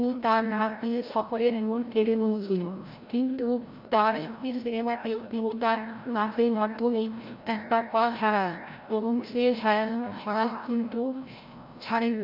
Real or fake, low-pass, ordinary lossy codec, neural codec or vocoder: fake; 5.4 kHz; MP3, 48 kbps; codec, 16 kHz, 0.5 kbps, FreqCodec, larger model